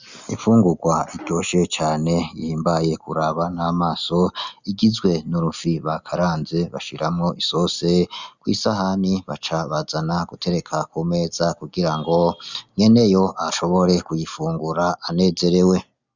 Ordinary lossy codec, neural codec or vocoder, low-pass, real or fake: Opus, 64 kbps; none; 7.2 kHz; real